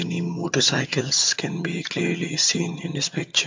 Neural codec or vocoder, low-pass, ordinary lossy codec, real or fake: vocoder, 22.05 kHz, 80 mel bands, HiFi-GAN; 7.2 kHz; MP3, 48 kbps; fake